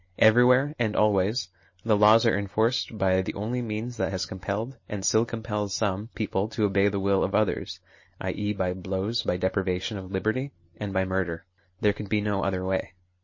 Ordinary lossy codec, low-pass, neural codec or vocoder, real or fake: MP3, 32 kbps; 7.2 kHz; none; real